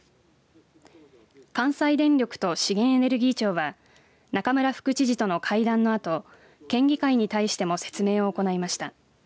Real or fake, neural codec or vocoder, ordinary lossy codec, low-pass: real; none; none; none